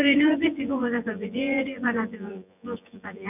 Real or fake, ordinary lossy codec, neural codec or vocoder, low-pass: fake; none; vocoder, 24 kHz, 100 mel bands, Vocos; 3.6 kHz